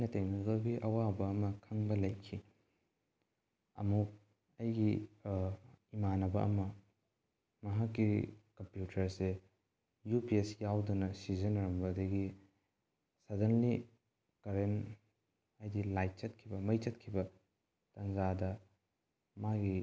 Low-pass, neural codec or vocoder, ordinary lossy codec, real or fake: none; none; none; real